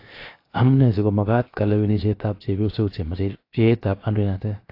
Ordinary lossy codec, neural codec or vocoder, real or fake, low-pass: AAC, 32 kbps; codec, 16 kHz, 0.7 kbps, FocalCodec; fake; 5.4 kHz